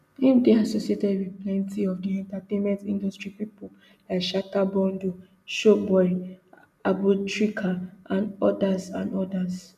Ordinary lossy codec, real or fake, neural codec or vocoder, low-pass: none; real; none; 14.4 kHz